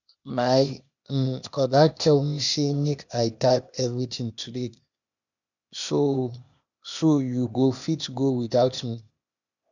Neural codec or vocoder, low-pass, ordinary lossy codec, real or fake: codec, 16 kHz, 0.8 kbps, ZipCodec; 7.2 kHz; none; fake